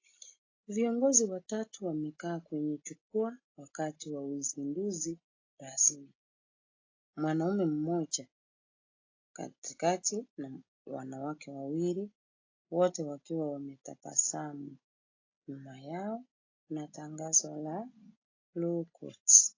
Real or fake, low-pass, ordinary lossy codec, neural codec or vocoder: real; 7.2 kHz; AAC, 32 kbps; none